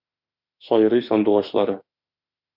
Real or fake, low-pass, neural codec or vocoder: fake; 5.4 kHz; autoencoder, 48 kHz, 32 numbers a frame, DAC-VAE, trained on Japanese speech